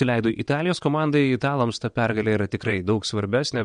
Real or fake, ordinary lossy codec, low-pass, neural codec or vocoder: fake; MP3, 64 kbps; 9.9 kHz; vocoder, 22.05 kHz, 80 mel bands, WaveNeXt